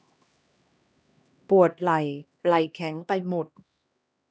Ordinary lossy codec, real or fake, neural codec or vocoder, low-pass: none; fake; codec, 16 kHz, 1 kbps, X-Codec, HuBERT features, trained on LibriSpeech; none